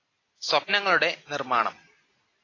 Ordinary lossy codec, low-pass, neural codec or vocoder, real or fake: AAC, 32 kbps; 7.2 kHz; none; real